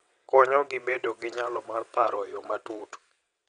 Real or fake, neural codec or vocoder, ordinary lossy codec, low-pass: fake; vocoder, 22.05 kHz, 80 mel bands, WaveNeXt; none; 9.9 kHz